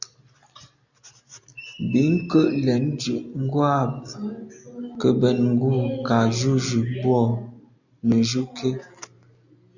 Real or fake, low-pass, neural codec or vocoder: real; 7.2 kHz; none